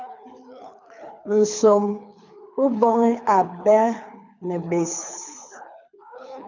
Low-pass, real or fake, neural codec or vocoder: 7.2 kHz; fake; codec, 24 kHz, 6 kbps, HILCodec